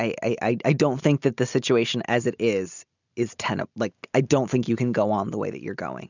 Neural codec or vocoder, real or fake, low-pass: none; real; 7.2 kHz